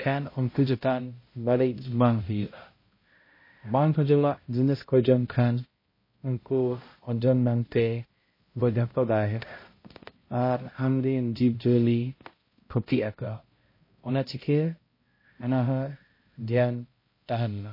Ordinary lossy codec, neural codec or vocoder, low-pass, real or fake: MP3, 24 kbps; codec, 16 kHz, 0.5 kbps, X-Codec, HuBERT features, trained on balanced general audio; 5.4 kHz; fake